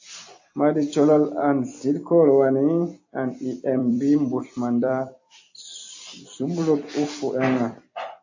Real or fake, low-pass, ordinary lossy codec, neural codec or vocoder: fake; 7.2 kHz; AAC, 48 kbps; vocoder, 44.1 kHz, 128 mel bands every 256 samples, BigVGAN v2